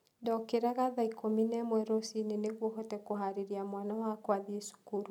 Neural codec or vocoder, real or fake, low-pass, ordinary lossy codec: none; real; 19.8 kHz; none